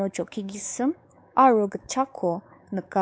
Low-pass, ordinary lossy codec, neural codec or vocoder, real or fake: none; none; codec, 16 kHz, 4 kbps, X-Codec, WavLM features, trained on Multilingual LibriSpeech; fake